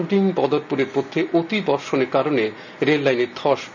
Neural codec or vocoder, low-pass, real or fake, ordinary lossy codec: none; 7.2 kHz; real; none